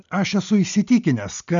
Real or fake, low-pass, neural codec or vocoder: real; 7.2 kHz; none